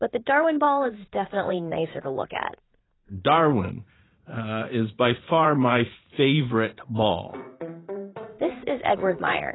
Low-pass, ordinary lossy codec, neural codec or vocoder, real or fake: 7.2 kHz; AAC, 16 kbps; vocoder, 44.1 kHz, 80 mel bands, Vocos; fake